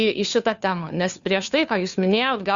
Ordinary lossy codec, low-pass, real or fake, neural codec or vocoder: Opus, 64 kbps; 7.2 kHz; fake; codec, 16 kHz, 2 kbps, FunCodec, trained on Chinese and English, 25 frames a second